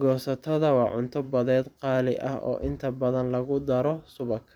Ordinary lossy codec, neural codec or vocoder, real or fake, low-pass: none; vocoder, 44.1 kHz, 128 mel bands every 512 samples, BigVGAN v2; fake; 19.8 kHz